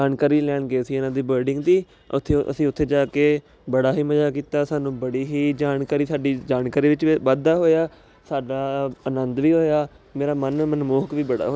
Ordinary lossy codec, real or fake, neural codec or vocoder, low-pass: none; real; none; none